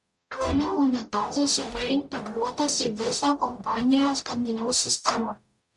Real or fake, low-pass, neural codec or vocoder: fake; 10.8 kHz; codec, 44.1 kHz, 0.9 kbps, DAC